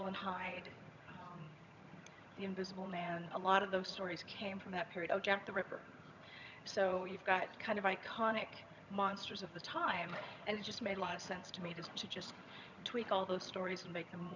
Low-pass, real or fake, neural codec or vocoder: 7.2 kHz; fake; vocoder, 22.05 kHz, 80 mel bands, HiFi-GAN